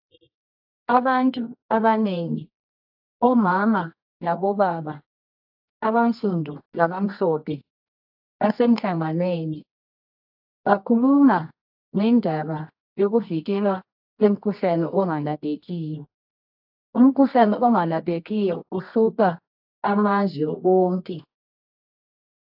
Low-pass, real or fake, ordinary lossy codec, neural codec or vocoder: 5.4 kHz; fake; AAC, 48 kbps; codec, 24 kHz, 0.9 kbps, WavTokenizer, medium music audio release